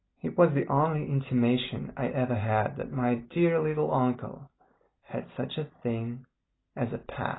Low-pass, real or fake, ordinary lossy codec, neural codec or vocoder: 7.2 kHz; real; AAC, 16 kbps; none